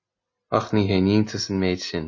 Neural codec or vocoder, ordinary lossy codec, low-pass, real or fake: none; MP3, 32 kbps; 7.2 kHz; real